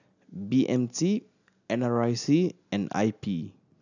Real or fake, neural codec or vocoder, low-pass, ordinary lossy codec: real; none; 7.2 kHz; none